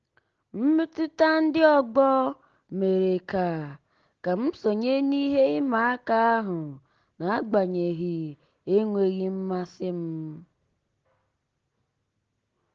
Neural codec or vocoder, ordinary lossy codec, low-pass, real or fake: none; Opus, 16 kbps; 7.2 kHz; real